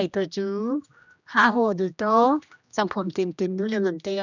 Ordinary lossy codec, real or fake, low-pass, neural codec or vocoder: none; fake; 7.2 kHz; codec, 16 kHz, 1 kbps, X-Codec, HuBERT features, trained on general audio